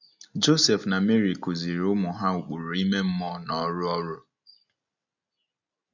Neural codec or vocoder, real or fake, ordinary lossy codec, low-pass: none; real; none; 7.2 kHz